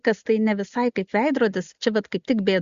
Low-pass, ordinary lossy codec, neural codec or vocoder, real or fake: 7.2 kHz; Opus, 64 kbps; none; real